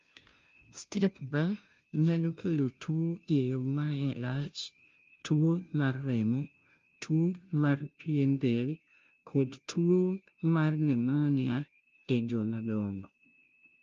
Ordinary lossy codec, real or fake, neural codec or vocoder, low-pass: Opus, 32 kbps; fake; codec, 16 kHz, 0.5 kbps, FunCodec, trained on Chinese and English, 25 frames a second; 7.2 kHz